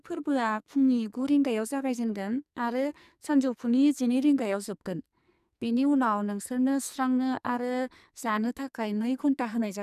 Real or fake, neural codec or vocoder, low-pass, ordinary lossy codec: fake; codec, 44.1 kHz, 2.6 kbps, SNAC; 14.4 kHz; none